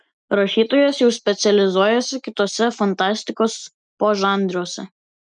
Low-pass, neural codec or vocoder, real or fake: 9.9 kHz; none; real